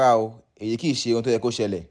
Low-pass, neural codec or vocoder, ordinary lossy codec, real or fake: 9.9 kHz; none; none; real